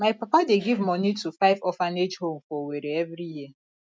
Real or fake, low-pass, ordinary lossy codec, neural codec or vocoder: real; none; none; none